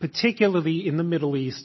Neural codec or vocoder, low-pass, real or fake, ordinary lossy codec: none; 7.2 kHz; real; MP3, 24 kbps